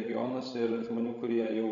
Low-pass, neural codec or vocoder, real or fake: 7.2 kHz; codec, 16 kHz, 16 kbps, FreqCodec, larger model; fake